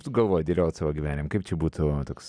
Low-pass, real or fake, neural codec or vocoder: 9.9 kHz; real; none